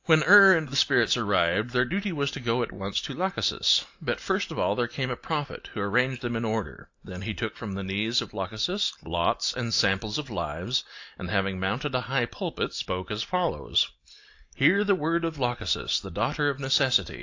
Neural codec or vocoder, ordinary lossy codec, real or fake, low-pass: none; AAC, 48 kbps; real; 7.2 kHz